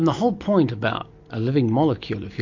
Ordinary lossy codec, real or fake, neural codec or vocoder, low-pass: MP3, 48 kbps; real; none; 7.2 kHz